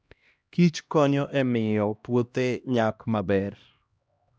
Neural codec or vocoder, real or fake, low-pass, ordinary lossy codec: codec, 16 kHz, 1 kbps, X-Codec, HuBERT features, trained on LibriSpeech; fake; none; none